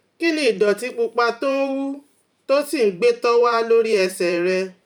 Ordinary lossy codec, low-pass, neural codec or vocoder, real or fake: none; 19.8 kHz; vocoder, 44.1 kHz, 128 mel bands every 512 samples, BigVGAN v2; fake